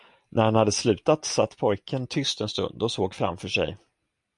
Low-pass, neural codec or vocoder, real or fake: 10.8 kHz; none; real